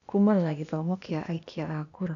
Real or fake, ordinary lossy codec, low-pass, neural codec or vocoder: fake; none; 7.2 kHz; codec, 16 kHz, 0.8 kbps, ZipCodec